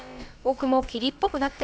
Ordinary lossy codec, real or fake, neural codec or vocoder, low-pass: none; fake; codec, 16 kHz, about 1 kbps, DyCAST, with the encoder's durations; none